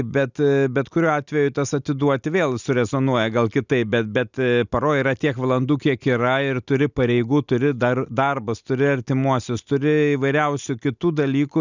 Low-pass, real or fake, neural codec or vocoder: 7.2 kHz; real; none